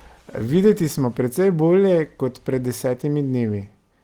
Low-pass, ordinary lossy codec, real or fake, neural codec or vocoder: 19.8 kHz; Opus, 24 kbps; real; none